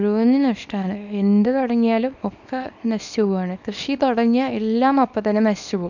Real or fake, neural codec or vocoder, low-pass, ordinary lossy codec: fake; codec, 24 kHz, 0.9 kbps, WavTokenizer, small release; 7.2 kHz; none